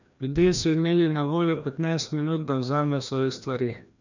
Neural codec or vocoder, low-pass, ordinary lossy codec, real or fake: codec, 16 kHz, 1 kbps, FreqCodec, larger model; 7.2 kHz; none; fake